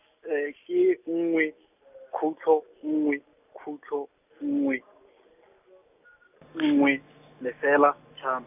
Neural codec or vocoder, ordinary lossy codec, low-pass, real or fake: none; none; 3.6 kHz; real